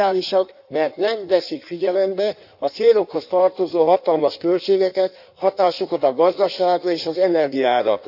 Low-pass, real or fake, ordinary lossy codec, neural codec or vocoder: 5.4 kHz; fake; none; codec, 16 kHz in and 24 kHz out, 1.1 kbps, FireRedTTS-2 codec